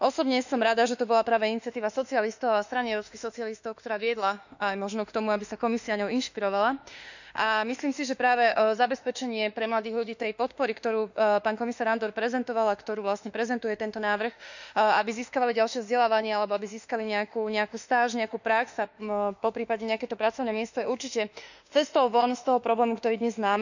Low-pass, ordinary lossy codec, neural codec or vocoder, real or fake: 7.2 kHz; none; autoencoder, 48 kHz, 32 numbers a frame, DAC-VAE, trained on Japanese speech; fake